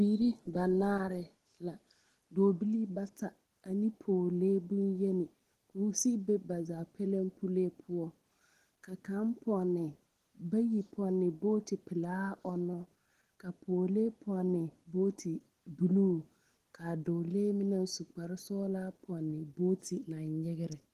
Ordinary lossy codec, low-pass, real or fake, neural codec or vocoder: Opus, 16 kbps; 14.4 kHz; real; none